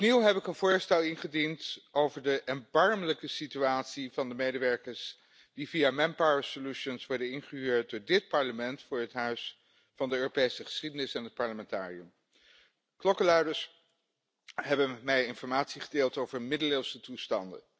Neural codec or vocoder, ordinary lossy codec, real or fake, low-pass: none; none; real; none